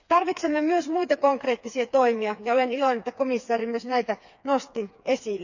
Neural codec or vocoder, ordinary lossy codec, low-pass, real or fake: codec, 16 kHz, 4 kbps, FreqCodec, smaller model; none; 7.2 kHz; fake